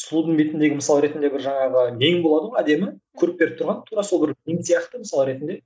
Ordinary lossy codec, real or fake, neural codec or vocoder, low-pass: none; real; none; none